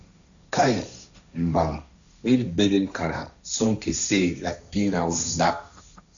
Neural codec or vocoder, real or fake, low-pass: codec, 16 kHz, 1.1 kbps, Voila-Tokenizer; fake; 7.2 kHz